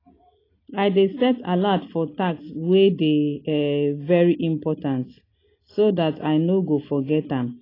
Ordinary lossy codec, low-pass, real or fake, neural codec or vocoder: AAC, 24 kbps; 5.4 kHz; real; none